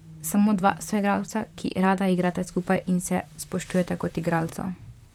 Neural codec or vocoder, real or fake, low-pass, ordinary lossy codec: vocoder, 44.1 kHz, 128 mel bands, Pupu-Vocoder; fake; 19.8 kHz; none